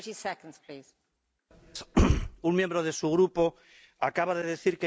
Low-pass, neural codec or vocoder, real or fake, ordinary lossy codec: none; none; real; none